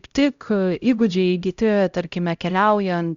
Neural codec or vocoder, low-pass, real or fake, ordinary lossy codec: codec, 16 kHz, 0.5 kbps, X-Codec, HuBERT features, trained on LibriSpeech; 7.2 kHz; fake; Opus, 64 kbps